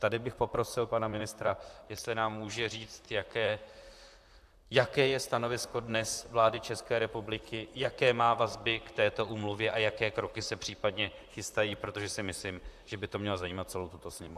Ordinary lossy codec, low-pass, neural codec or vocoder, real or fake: AAC, 96 kbps; 14.4 kHz; vocoder, 44.1 kHz, 128 mel bands, Pupu-Vocoder; fake